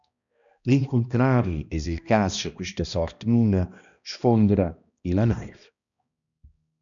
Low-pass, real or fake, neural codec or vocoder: 7.2 kHz; fake; codec, 16 kHz, 1 kbps, X-Codec, HuBERT features, trained on balanced general audio